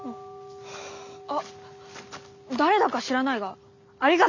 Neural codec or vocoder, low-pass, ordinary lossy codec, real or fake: none; 7.2 kHz; none; real